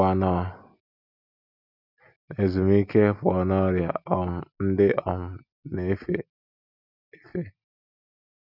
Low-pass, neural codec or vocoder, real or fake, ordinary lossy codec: 5.4 kHz; none; real; none